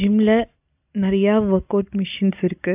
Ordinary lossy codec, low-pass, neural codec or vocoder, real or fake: AAC, 32 kbps; 3.6 kHz; none; real